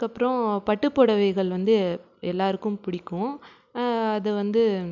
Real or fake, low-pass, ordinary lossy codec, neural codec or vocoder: real; 7.2 kHz; none; none